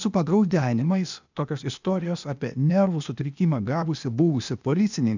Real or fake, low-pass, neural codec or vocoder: fake; 7.2 kHz; codec, 16 kHz, 0.8 kbps, ZipCodec